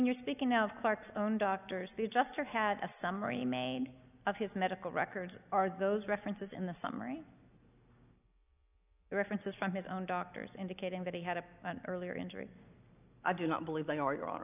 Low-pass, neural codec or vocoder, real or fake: 3.6 kHz; none; real